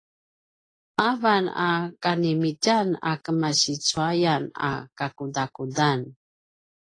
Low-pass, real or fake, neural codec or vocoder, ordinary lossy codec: 9.9 kHz; real; none; AAC, 32 kbps